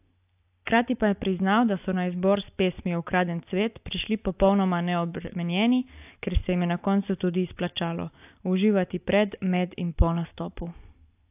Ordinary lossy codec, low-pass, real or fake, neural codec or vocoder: AAC, 32 kbps; 3.6 kHz; real; none